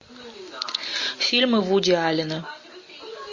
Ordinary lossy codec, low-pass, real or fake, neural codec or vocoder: MP3, 32 kbps; 7.2 kHz; real; none